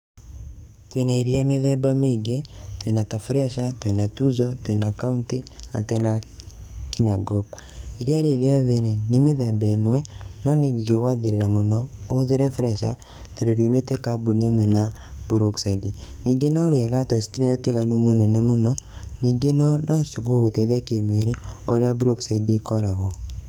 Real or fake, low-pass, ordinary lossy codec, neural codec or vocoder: fake; none; none; codec, 44.1 kHz, 2.6 kbps, SNAC